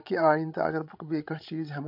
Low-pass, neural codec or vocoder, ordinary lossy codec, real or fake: 5.4 kHz; codec, 16 kHz, 16 kbps, FreqCodec, larger model; AAC, 48 kbps; fake